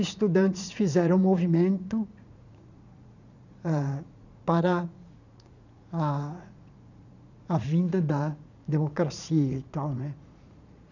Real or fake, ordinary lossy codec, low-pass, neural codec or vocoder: fake; none; 7.2 kHz; vocoder, 44.1 kHz, 80 mel bands, Vocos